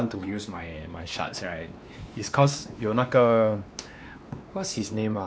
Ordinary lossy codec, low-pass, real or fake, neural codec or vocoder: none; none; fake; codec, 16 kHz, 2 kbps, X-Codec, WavLM features, trained on Multilingual LibriSpeech